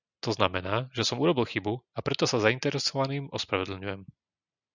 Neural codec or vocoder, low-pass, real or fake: none; 7.2 kHz; real